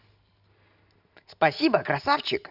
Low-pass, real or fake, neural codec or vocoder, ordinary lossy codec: 5.4 kHz; real; none; none